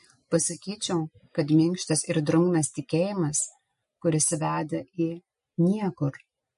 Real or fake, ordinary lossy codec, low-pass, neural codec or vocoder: real; MP3, 48 kbps; 10.8 kHz; none